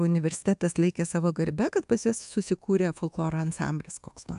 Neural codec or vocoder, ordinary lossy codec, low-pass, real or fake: codec, 24 kHz, 1.2 kbps, DualCodec; AAC, 96 kbps; 10.8 kHz; fake